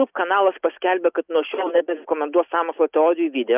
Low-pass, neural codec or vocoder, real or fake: 3.6 kHz; none; real